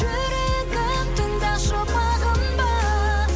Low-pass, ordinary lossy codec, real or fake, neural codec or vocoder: none; none; real; none